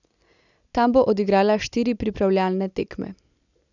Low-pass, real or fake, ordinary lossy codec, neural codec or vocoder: 7.2 kHz; real; none; none